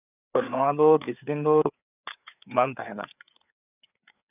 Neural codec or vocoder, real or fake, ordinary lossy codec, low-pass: codec, 16 kHz, 4 kbps, FreqCodec, larger model; fake; none; 3.6 kHz